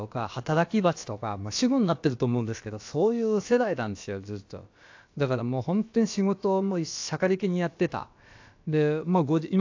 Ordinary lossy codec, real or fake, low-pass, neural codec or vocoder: AAC, 48 kbps; fake; 7.2 kHz; codec, 16 kHz, about 1 kbps, DyCAST, with the encoder's durations